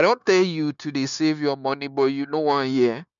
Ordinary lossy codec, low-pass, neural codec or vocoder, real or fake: none; 7.2 kHz; codec, 16 kHz, 0.9 kbps, LongCat-Audio-Codec; fake